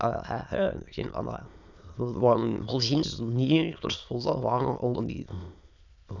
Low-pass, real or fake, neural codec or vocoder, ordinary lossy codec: 7.2 kHz; fake; autoencoder, 22.05 kHz, a latent of 192 numbers a frame, VITS, trained on many speakers; none